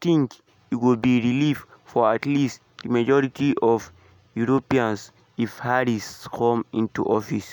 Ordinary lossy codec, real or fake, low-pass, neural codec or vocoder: none; real; none; none